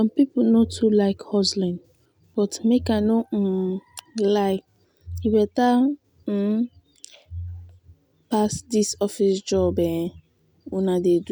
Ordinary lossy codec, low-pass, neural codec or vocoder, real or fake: none; none; none; real